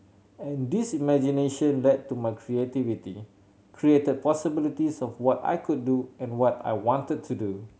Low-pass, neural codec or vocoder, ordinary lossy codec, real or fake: none; none; none; real